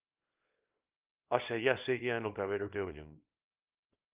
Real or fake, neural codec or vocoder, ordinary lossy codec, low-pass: fake; codec, 24 kHz, 0.9 kbps, WavTokenizer, small release; Opus, 32 kbps; 3.6 kHz